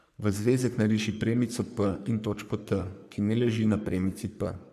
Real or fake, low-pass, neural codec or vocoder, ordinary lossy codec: fake; 14.4 kHz; codec, 44.1 kHz, 3.4 kbps, Pupu-Codec; none